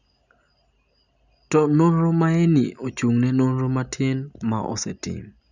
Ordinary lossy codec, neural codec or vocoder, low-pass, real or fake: none; none; 7.2 kHz; real